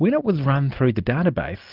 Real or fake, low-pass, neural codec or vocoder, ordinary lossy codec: real; 5.4 kHz; none; Opus, 32 kbps